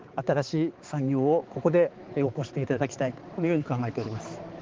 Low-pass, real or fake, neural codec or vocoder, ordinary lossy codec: 7.2 kHz; fake; codec, 16 kHz, 4 kbps, X-Codec, HuBERT features, trained on balanced general audio; Opus, 16 kbps